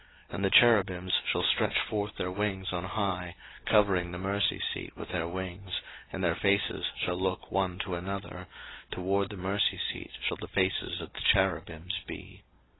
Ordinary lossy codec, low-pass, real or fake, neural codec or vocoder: AAC, 16 kbps; 7.2 kHz; real; none